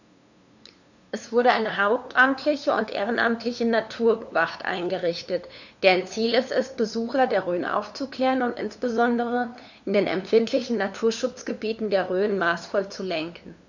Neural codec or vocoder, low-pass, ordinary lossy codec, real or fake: codec, 16 kHz, 2 kbps, FunCodec, trained on LibriTTS, 25 frames a second; 7.2 kHz; none; fake